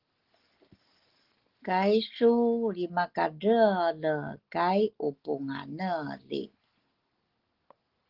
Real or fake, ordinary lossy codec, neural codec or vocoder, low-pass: real; Opus, 16 kbps; none; 5.4 kHz